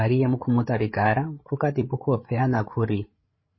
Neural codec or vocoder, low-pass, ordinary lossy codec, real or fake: codec, 16 kHz, 8 kbps, FreqCodec, larger model; 7.2 kHz; MP3, 24 kbps; fake